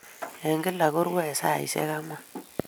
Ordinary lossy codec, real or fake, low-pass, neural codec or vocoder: none; real; none; none